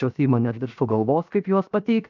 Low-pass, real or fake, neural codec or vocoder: 7.2 kHz; fake; codec, 16 kHz, 0.7 kbps, FocalCodec